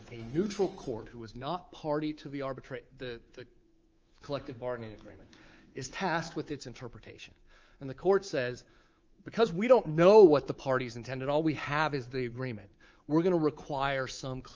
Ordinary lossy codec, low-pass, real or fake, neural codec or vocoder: Opus, 24 kbps; 7.2 kHz; fake; codec, 24 kHz, 3.1 kbps, DualCodec